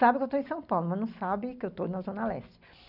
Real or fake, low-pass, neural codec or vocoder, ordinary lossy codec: real; 5.4 kHz; none; none